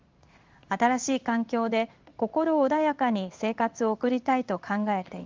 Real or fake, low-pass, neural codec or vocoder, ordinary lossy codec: fake; 7.2 kHz; codec, 16 kHz in and 24 kHz out, 1 kbps, XY-Tokenizer; Opus, 32 kbps